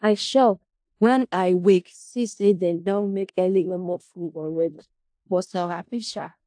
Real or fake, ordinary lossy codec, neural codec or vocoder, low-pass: fake; AAC, 64 kbps; codec, 16 kHz in and 24 kHz out, 0.4 kbps, LongCat-Audio-Codec, four codebook decoder; 9.9 kHz